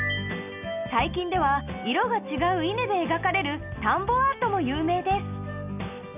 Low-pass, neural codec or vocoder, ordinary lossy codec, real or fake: 3.6 kHz; none; none; real